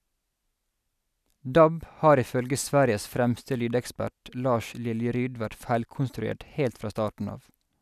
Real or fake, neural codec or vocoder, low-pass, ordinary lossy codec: real; none; 14.4 kHz; none